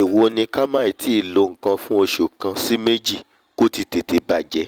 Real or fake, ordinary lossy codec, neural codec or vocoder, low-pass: fake; Opus, 32 kbps; vocoder, 44.1 kHz, 128 mel bands every 256 samples, BigVGAN v2; 19.8 kHz